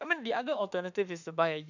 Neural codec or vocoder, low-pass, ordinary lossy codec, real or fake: autoencoder, 48 kHz, 32 numbers a frame, DAC-VAE, trained on Japanese speech; 7.2 kHz; none; fake